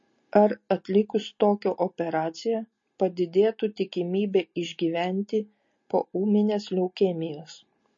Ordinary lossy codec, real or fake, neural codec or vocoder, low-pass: MP3, 32 kbps; real; none; 7.2 kHz